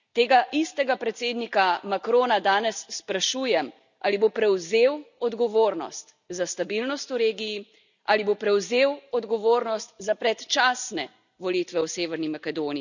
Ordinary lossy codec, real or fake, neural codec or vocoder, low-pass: none; real; none; 7.2 kHz